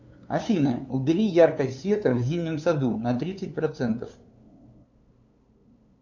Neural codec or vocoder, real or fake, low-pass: codec, 16 kHz, 2 kbps, FunCodec, trained on LibriTTS, 25 frames a second; fake; 7.2 kHz